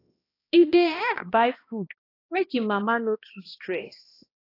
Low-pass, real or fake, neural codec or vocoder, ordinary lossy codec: 5.4 kHz; fake; codec, 16 kHz, 1 kbps, X-Codec, HuBERT features, trained on balanced general audio; AAC, 32 kbps